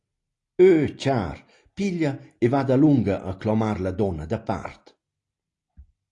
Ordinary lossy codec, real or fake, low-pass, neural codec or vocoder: AAC, 48 kbps; real; 10.8 kHz; none